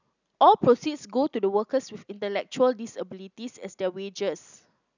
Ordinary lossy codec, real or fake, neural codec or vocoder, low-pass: none; real; none; 7.2 kHz